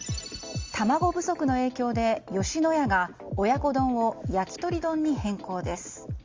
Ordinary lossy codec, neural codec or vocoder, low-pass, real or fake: Opus, 32 kbps; none; 7.2 kHz; real